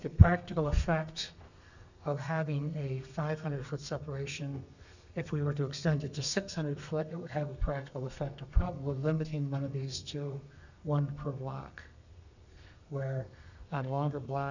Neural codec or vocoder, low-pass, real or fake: codec, 32 kHz, 1.9 kbps, SNAC; 7.2 kHz; fake